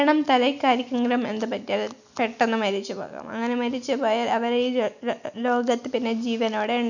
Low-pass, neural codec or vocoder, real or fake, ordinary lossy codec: 7.2 kHz; none; real; none